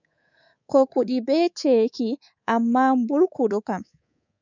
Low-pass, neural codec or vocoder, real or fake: 7.2 kHz; codec, 24 kHz, 3.1 kbps, DualCodec; fake